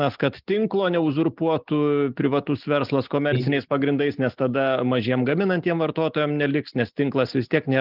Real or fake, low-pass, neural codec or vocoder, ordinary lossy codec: real; 5.4 kHz; none; Opus, 32 kbps